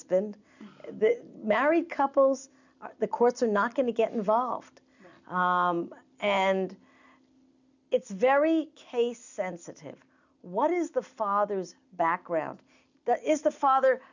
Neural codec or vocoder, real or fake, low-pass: none; real; 7.2 kHz